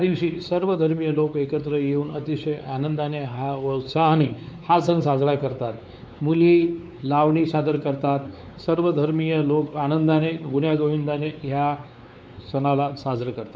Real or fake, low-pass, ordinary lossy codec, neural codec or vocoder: fake; none; none; codec, 16 kHz, 4 kbps, X-Codec, WavLM features, trained on Multilingual LibriSpeech